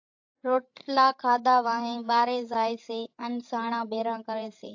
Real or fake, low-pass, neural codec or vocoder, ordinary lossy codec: fake; 7.2 kHz; codec, 16 kHz, 16 kbps, FreqCodec, larger model; AAC, 48 kbps